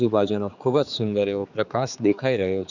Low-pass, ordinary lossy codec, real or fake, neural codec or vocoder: 7.2 kHz; none; fake; codec, 16 kHz, 4 kbps, X-Codec, HuBERT features, trained on general audio